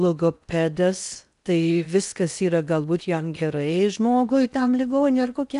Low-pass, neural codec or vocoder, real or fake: 10.8 kHz; codec, 16 kHz in and 24 kHz out, 0.8 kbps, FocalCodec, streaming, 65536 codes; fake